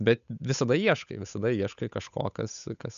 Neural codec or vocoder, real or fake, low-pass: codec, 16 kHz, 8 kbps, FunCodec, trained on Chinese and English, 25 frames a second; fake; 7.2 kHz